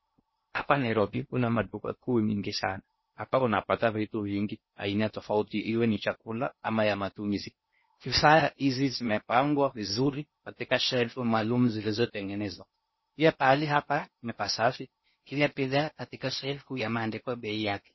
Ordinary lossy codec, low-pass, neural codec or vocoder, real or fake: MP3, 24 kbps; 7.2 kHz; codec, 16 kHz in and 24 kHz out, 0.6 kbps, FocalCodec, streaming, 2048 codes; fake